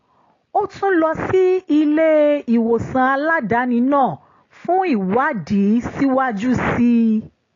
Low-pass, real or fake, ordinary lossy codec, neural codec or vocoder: 7.2 kHz; real; AAC, 32 kbps; none